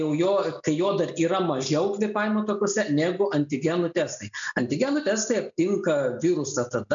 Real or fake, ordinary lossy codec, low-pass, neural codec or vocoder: real; MP3, 64 kbps; 7.2 kHz; none